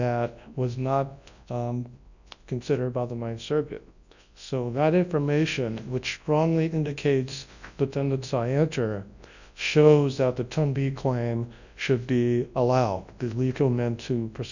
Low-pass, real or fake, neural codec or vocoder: 7.2 kHz; fake; codec, 24 kHz, 0.9 kbps, WavTokenizer, large speech release